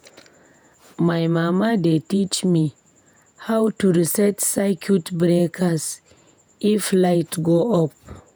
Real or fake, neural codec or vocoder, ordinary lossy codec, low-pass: fake; vocoder, 48 kHz, 128 mel bands, Vocos; none; none